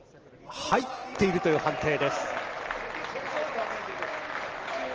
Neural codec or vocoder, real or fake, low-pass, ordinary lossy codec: none; real; 7.2 kHz; Opus, 16 kbps